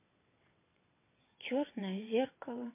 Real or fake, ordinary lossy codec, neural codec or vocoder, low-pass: fake; MP3, 24 kbps; vocoder, 22.05 kHz, 80 mel bands, WaveNeXt; 3.6 kHz